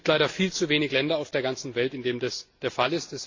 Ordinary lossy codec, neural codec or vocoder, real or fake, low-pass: AAC, 48 kbps; none; real; 7.2 kHz